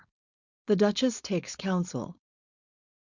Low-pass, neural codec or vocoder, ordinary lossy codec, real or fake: 7.2 kHz; codec, 16 kHz, 4.8 kbps, FACodec; Opus, 64 kbps; fake